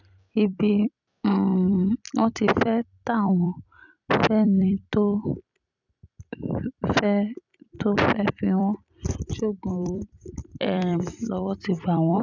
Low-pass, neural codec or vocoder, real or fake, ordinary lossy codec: 7.2 kHz; vocoder, 44.1 kHz, 128 mel bands every 256 samples, BigVGAN v2; fake; none